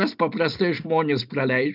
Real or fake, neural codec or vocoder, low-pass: real; none; 5.4 kHz